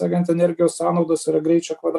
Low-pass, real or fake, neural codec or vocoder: 14.4 kHz; real; none